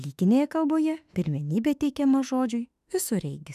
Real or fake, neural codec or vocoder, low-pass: fake; autoencoder, 48 kHz, 32 numbers a frame, DAC-VAE, trained on Japanese speech; 14.4 kHz